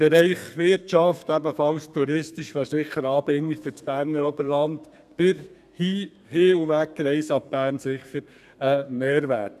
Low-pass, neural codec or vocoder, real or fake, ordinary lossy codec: 14.4 kHz; codec, 32 kHz, 1.9 kbps, SNAC; fake; none